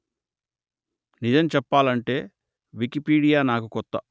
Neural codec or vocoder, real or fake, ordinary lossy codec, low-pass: none; real; none; none